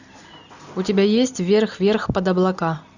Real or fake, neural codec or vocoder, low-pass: real; none; 7.2 kHz